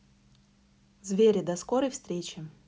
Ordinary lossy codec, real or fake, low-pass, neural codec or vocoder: none; real; none; none